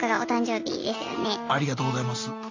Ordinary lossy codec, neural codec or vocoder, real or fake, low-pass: AAC, 48 kbps; none; real; 7.2 kHz